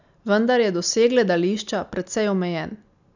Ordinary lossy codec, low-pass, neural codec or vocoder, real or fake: none; 7.2 kHz; none; real